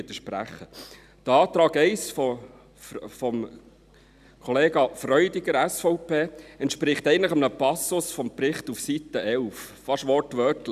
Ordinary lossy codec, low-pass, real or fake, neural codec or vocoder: none; 14.4 kHz; real; none